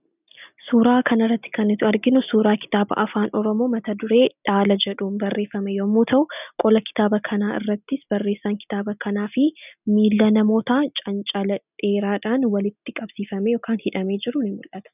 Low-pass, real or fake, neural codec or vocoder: 3.6 kHz; real; none